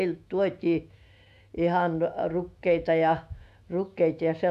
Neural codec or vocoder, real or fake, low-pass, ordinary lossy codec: autoencoder, 48 kHz, 128 numbers a frame, DAC-VAE, trained on Japanese speech; fake; 14.4 kHz; none